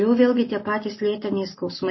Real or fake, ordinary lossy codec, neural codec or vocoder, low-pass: real; MP3, 24 kbps; none; 7.2 kHz